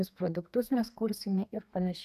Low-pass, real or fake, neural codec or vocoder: 14.4 kHz; fake; codec, 32 kHz, 1.9 kbps, SNAC